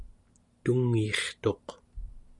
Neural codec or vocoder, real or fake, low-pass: none; real; 10.8 kHz